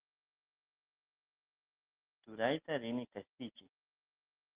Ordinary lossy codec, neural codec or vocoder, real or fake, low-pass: Opus, 16 kbps; none; real; 3.6 kHz